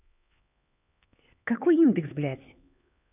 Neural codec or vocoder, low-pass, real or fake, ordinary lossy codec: codec, 16 kHz, 4 kbps, X-Codec, HuBERT features, trained on LibriSpeech; 3.6 kHz; fake; none